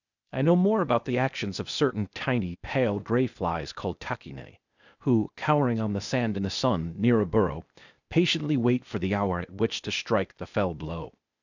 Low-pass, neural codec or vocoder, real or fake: 7.2 kHz; codec, 16 kHz, 0.8 kbps, ZipCodec; fake